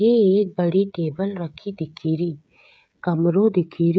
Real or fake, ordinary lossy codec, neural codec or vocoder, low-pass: fake; none; codec, 16 kHz, 16 kbps, FreqCodec, smaller model; none